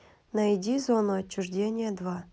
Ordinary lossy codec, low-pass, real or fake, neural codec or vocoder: none; none; real; none